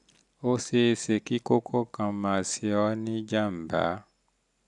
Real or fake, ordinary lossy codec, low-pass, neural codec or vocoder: real; none; 10.8 kHz; none